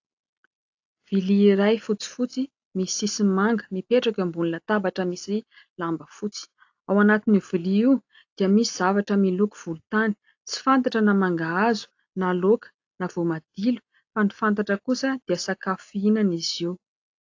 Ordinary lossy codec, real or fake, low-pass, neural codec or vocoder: AAC, 48 kbps; real; 7.2 kHz; none